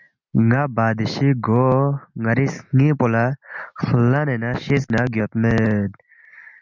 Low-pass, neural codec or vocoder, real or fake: 7.2 kHz; none; real